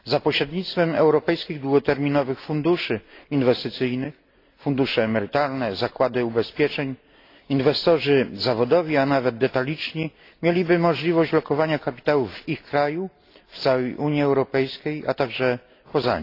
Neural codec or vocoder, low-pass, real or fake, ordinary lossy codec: none; 5.4 kHz; real; AAC, 32 kbps